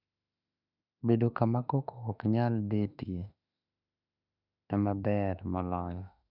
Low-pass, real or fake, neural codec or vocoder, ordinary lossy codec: 5.4 kHz; fake; autoencoder, 48 kHz, 32 numbers a frame, DAC-VAE, trained on Japanese speech; none